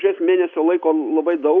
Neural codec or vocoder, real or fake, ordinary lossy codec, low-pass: none; real; MP3, 64 kbps; 7.2 kHz